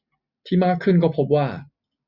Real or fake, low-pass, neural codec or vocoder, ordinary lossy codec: real; 5.4 kHz; none; Opus, 64 kbps